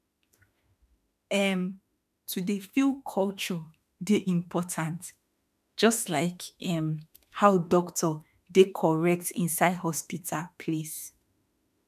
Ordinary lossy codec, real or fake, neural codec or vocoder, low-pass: none; fake; autoencoder, 48 kHz, 32 numbers a frame, DAC-VAE, trained on Japanese speech; 14.4 kHz